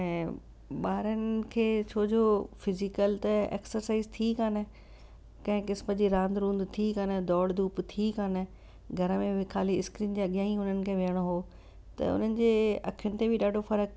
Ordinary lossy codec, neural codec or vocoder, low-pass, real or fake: none; none; none; real